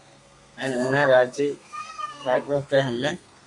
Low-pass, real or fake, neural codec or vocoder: 10.8 kHz; fake; codec, 32 kHz, 1.9 kbps, SNAC